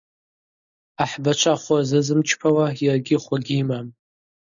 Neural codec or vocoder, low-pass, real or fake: none; 7.2 kHz; real